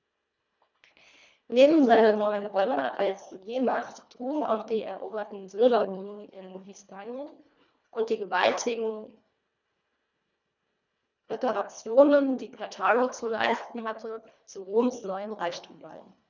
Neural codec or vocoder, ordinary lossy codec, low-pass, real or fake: codec, 24 kHz, 1.5 kbps, HILCodec; none; 7.2 kHz; fake